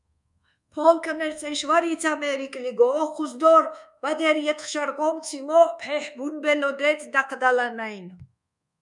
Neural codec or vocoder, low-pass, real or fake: codec, 24 kHz, 1.2 kbps, DualCodec; 10.8 kHz; fake